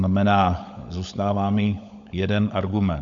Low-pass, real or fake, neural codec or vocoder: 7.2 kHz; fake; codec, 16 kHz, 16 kbps, FunCodec, trained on LibriTTS, 50 frames a second